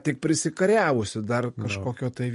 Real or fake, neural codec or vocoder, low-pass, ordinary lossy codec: real; none; 14.4 kHz; MP3, 48 kbps